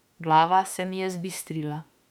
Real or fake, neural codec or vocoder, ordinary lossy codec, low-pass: fake; autoencoder, 48 kHz, 32 numbers a frame, DAC-VAE, trained on Japanese speech; none; 19.8 kHz